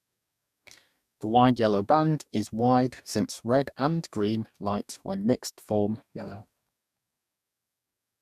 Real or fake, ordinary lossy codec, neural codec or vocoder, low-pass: fake; none; codec, 44.1 kHz, 2.6 kbps, DAC; 14.4 kHz